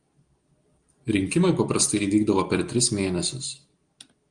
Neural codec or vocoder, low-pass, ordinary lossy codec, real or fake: none; 9.9 kHz; Opus, 24 kbps; real